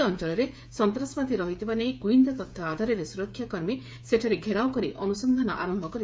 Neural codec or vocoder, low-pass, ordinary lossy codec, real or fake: codec, 16 kHz, 8 kbps, FreqCodec, smaller model; none; none; fake